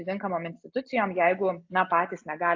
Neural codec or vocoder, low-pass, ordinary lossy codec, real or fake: none; 7.2 kHz; AAC, 48 kbps; real